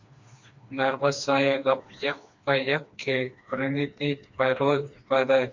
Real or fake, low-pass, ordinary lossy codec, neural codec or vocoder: fake; 7.2 kHz; MP3, 48 kbps; codec, 16 kHz, 2 kbps, FreqCodec, smaller model